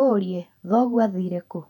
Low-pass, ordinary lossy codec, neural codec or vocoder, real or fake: 19.8 kHz; none; vocoder, 48 kHz, 128 mel bands, Vocos; fake